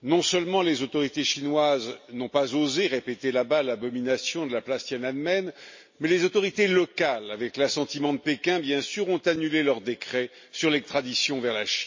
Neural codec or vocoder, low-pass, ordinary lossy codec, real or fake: none; 7.2 kHz; MP3, 32 kbps; real